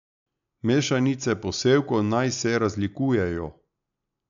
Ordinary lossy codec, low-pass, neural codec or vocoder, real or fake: none; 7.2 kHz; none; real